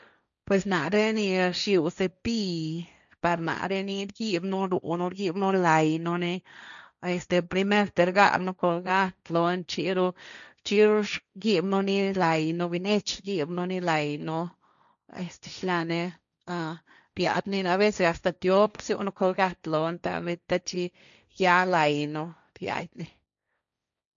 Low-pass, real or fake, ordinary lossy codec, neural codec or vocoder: 7.2 kHz; fake; none; codec, 16 kHz, 1.1 kbps, Voila-Tokenizer